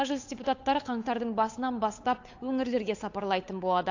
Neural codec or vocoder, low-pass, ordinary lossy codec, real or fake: codec, 16 kHz, 8 kbps, FunCodec, trained on Chinese and English, 25 frames a second; 7.2 kHz; AAC, 48 kbps; fake